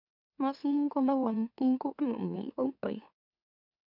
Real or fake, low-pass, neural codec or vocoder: fake; 5.4 kHz; autoencoder, 44.1 kHz, a latent of 192 numbers a frame, MeloTTS